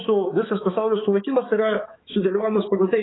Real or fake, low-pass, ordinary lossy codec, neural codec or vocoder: fake; 7.2 kHz; AAC, 16 kbps; codec, 16 kHz, 4 kbps, X-Codec, HuBERT features, trained on balanced general audio